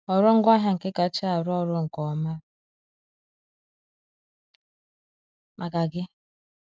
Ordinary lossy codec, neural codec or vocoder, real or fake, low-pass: none; none; real; none